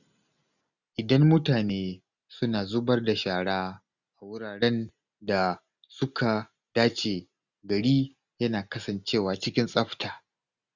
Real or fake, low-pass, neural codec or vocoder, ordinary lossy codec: real; 7.2 kHz; none; none